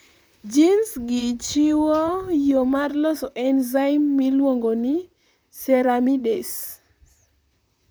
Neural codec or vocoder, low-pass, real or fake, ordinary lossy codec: vocoder, 44.1 kHz, 128 mel bands, Pupu-Vocoder; none; fake; none